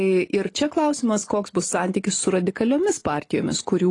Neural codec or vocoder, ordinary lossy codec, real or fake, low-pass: none; AAC, 32 kbps; real; 10.8 kHz